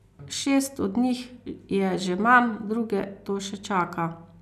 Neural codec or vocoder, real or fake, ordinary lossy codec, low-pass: none; real; none; 14.4 kHz